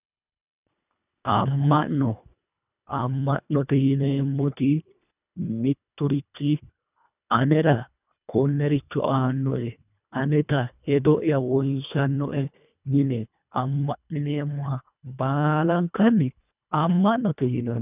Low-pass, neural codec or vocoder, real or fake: 3.6 kHz; codec, 24 kHz, 1.5 kbps, HILCodec; fake